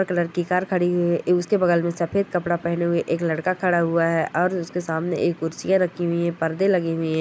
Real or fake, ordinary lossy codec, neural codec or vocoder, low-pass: real; none; none; none